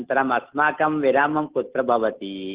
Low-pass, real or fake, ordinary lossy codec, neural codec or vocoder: 3.6 kHz; real; Opus, 16 kbps; none